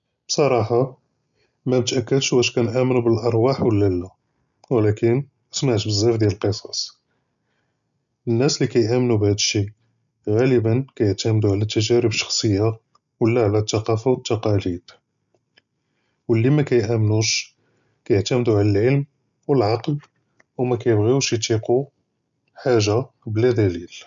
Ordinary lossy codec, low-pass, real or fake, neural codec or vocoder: none; 7.2 kHz; real; none